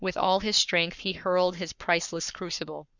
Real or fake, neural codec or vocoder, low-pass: fake; codec, 44.1 kHz, 7.8 kbps, Pupu-Codec; 7.2 kHz